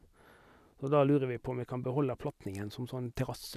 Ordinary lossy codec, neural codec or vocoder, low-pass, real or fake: none; none; 14.4 kHz; real